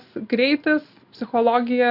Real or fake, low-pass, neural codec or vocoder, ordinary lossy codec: real; 5.4 kHz; none; Opus, 64 kbps